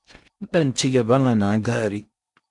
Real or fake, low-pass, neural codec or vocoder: fake; 10.8 kHz; codec, 16 kHz in and 24 kHz out, 0.8 kbps, FocalCodec, streaming, 65536 codes